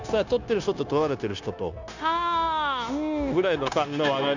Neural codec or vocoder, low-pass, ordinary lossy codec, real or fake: codec, 16 kHz, 0.9 kbps, LongCat-Audio-Codec; 7.2 kHz; none; fake